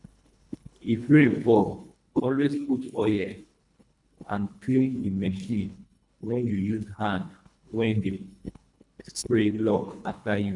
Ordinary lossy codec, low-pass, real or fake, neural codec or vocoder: none; 10.8 kHz; fake; codec, 24 kHz, 1.5 kbps, HILCodec